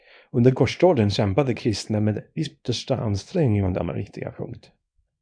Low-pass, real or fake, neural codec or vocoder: 9.9 kHz; fake; codec, 24 kHz, 0.9 kbps, WavTokenizer, small release